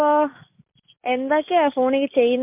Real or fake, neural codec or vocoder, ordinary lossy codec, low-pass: real; none; MP3, 32 kbps; 3.6 kHz